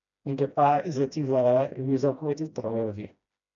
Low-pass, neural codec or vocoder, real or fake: 7.2 kHz; codec, 16 kHz, 1 kbps, FreqCodec, smaller model; fake